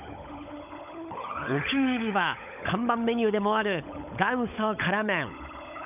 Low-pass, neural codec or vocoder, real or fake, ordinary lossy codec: 3.6 kHz; codec, 16 kHz, 16 kbps, FunCodec, trained on LibriTTS, 50 frames a second; fake; none